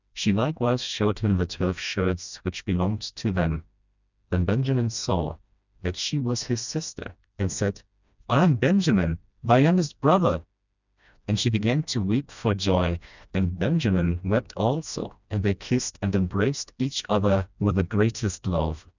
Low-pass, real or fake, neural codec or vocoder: 7.2 kHz; fake; codec, 16 kHz, 1 kbps, FreqCodec, smaller model